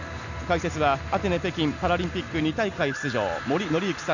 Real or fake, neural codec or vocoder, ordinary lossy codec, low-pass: real; none; none; 7.2 kHz